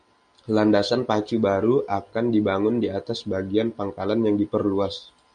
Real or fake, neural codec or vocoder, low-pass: real; none; 9.9 kHz